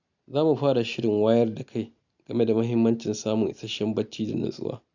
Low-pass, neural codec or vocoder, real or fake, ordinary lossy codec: 7.2 kHz; none; real; none